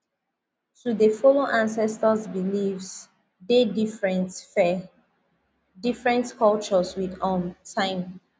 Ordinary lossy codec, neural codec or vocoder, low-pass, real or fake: none; none; none; real